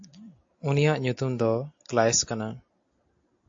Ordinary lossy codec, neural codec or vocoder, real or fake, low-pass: AAC, 48 kbps; none; real; 7.2 kHz